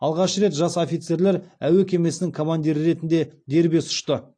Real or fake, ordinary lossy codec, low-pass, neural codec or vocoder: real; AAC, 48 kbps; 9.9 kHz; none